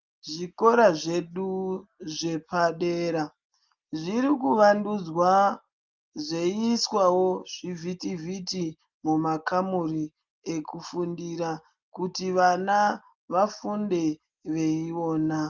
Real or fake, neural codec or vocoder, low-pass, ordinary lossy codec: real; none; 7.2 kHz; Opus, 24 kbps